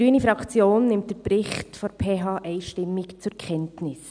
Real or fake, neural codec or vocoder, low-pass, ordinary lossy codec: real; none; 9.9 kHz; none